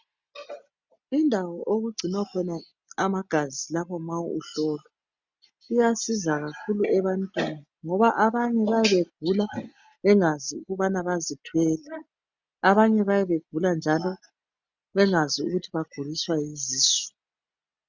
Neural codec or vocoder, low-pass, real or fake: none; 7.2 kHz; real